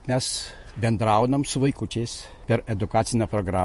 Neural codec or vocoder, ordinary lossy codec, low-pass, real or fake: vocoder, 48 kHz, 128 mel bands, Vocos; MP3, 48 kbps; 14.4 kHz; fake